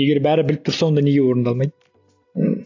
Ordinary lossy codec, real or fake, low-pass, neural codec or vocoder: AAC, 48 kbps; real; 7.2 kHz; none